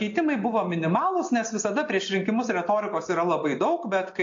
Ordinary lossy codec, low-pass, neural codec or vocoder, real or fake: AAC, 48 kbps; 7.2 kHz; none; real